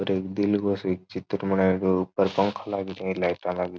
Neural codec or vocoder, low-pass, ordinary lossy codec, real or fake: none; none; none; real